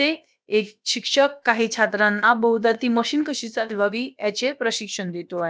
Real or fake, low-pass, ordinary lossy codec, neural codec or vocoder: fake; none; none; codec, 16 kHz, about 1 kbps, DyCAST, with the encoder's durations